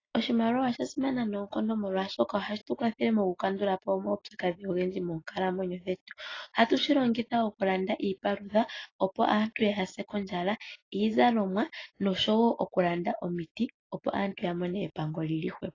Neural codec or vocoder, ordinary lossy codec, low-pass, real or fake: none; AAC, 32 kbps; 7.2 kHz; real